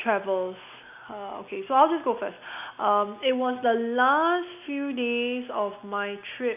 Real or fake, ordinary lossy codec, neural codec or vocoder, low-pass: real; none; none; 3.6 kHz